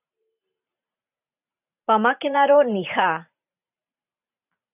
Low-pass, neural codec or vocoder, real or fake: 3.6 kHz; vocoder, 44.1 kHz, 128 mel bands every 512 samples, BigVGAN v2; fake